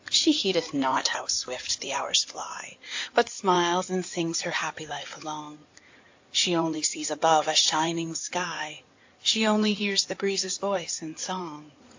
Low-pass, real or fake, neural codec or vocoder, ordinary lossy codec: 7.2 kHz; fake; codec, 16 kHz in and 24 kHz out, 2.2 kbps, FireRedTTS-2 codec; AAC, 48 kbps